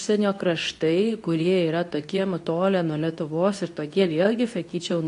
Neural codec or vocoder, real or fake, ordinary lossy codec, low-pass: codec, 24 kHz, 0.9 kbps, WavTokenizer, medium speech release version 2; fake; AAC, 48 kbps; 10.8 kHz